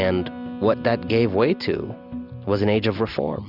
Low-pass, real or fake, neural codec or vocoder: 5.4 kHz; real; none